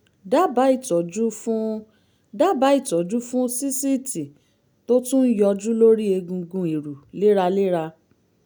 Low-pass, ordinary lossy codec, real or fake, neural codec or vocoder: none; none; real; none